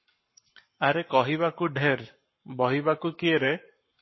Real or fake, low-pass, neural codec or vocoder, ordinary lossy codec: fake; 7.2 kHz; codec, 44.1 kHz, 7.8 kbps, Pupu-Codec; MP3, 24 kbps